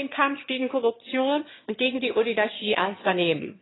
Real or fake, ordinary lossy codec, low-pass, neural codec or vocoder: fake; AAC, 16 kbps; 7.2 kHz; autoencoder, 22.05 kHz, a latent of 192 numbers a frame, VITS, trained on one speaker